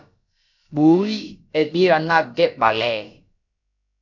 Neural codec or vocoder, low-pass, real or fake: codec, 16 kHz, about 1 kbps, DyCAST, with the encoder's durations; 7.2 kHz; fake